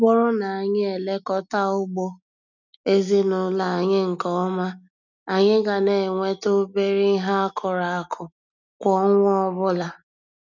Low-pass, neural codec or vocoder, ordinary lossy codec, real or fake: 7.2 kHz; none; none; real